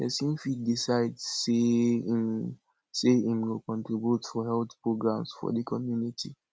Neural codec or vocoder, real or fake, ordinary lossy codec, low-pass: none; real; none; none